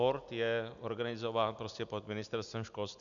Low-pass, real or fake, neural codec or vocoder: 7.2 kHz; real; none